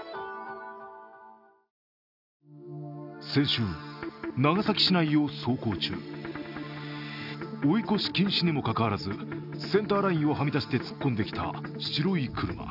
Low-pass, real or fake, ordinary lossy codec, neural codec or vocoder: 5.4 kHz; real; none; none